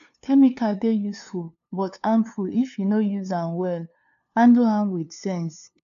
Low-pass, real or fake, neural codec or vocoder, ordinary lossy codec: 7.2 kHz; fake; codec, 16 kHz, 2 kbps, FunCodec, trained on LibriTTS, 25 frames a second; none